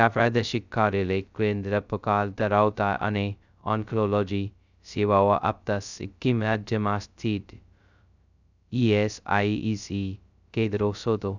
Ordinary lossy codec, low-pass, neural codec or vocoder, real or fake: none; 7.2 kHz; codec, 16 kHz, 0.2 kbps, FocalCodec; fake